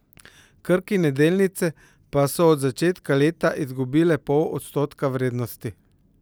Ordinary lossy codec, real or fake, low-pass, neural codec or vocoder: none; real; none; none